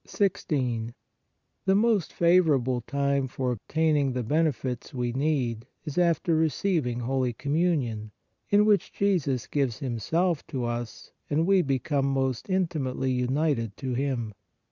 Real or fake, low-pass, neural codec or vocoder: real; 7.2 kHz; none